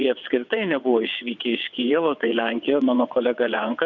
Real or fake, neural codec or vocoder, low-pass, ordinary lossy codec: fake; vocoder, 22.05 kHz, 80 mel bands, WaveNeXt; 7.2 kHz; Opus, 64 kbps